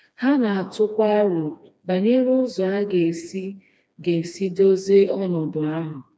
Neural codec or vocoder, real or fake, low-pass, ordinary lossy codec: codec, 16 kHz, 2 kbps, FreqCodec, smaller model; fake; none; none